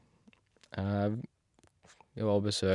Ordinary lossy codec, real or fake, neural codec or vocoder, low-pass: none; real; none; 10.8 kHz